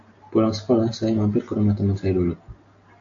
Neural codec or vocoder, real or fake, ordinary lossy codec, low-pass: none; real; AAC, 48 kbps; 7.2 kHz